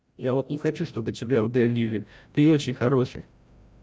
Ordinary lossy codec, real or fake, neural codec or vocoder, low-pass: none; fake; codec, 16 kHz, 0.5 kbps, FreqCodec, larger model; none